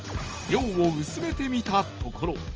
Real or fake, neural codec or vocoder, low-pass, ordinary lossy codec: real; none; 7.2 kHz; Opus, 24 kbps